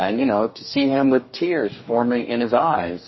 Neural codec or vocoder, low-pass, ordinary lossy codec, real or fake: codec, 44.1 kHz, 2.6 kbps, DAC; 7.2 kHz; MP3, 24 kbps; fake